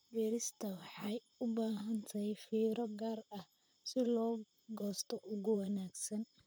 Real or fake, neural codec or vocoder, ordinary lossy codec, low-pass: fake; vocoder, 44.1 kHz, 128 mel bands, Pupu-Vocoder; none; none